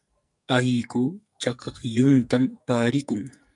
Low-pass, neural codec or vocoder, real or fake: 10.8 kHz; codec, 44.1 kHz, 2.6 kbps, SNAC; fake